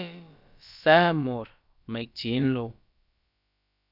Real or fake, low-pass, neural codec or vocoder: fake; 5.4 kHz; codec, 16 kHz, about 1 kbps, DyCAST, with the encoder's durations